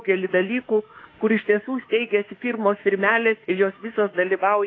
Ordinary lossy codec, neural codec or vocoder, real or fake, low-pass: AAC, 32 kbps; autoencoder, 48 kHz, 32 numbers a frame, DAC-VAE, trained on Japanese speech; fake; 7.2 kHz